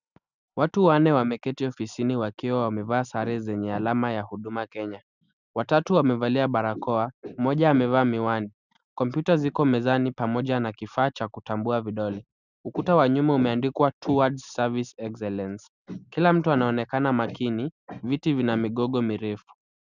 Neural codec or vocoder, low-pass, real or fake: none; 7.2 kHz; real